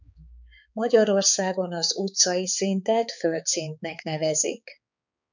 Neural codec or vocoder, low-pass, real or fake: codec, 16 kHz, 4 kbps, X-Codec, HuBERT features, trained on balanced general audio; 7.2 kHz; fake